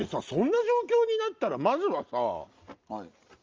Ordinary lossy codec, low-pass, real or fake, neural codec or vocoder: Opus, 24 kbps; 7.2 kHz; real; none